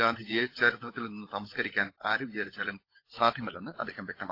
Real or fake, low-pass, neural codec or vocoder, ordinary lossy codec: fake; 5.4 kHz; codec, 16 kHz, 16 kbps, FunCodec, trained on LibriTTS, 50 frames a second; AAC, 32 kbps